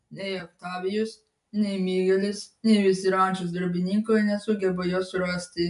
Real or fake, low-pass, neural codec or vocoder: real; 10.8 kHz; none